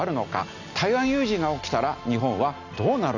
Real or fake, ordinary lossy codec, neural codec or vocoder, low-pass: real; none; none; 7.2 kHz